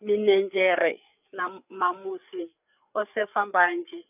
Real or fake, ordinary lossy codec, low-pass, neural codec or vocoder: fake; none; 3.6 kHz; codec, 16 kHz, 4 kbps, FreqCodec, larger model